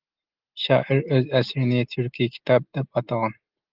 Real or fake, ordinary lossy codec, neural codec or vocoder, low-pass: real; Opus, 16 kbps; none; 5.4 kHz